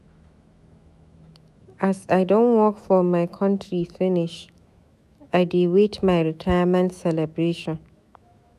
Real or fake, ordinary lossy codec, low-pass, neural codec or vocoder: fake; none; 14.4 kHz; autoencoder, 48 kHz, 128 numbers a frame, DAC-VAE, trained on Japanese speech